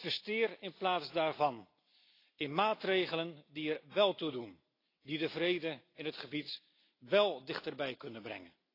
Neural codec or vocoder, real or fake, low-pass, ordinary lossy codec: none; real; 5.4 kHz; AAC, 32 kbps